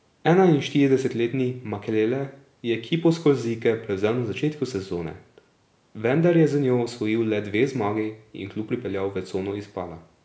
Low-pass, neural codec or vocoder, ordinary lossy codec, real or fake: none; none; none; real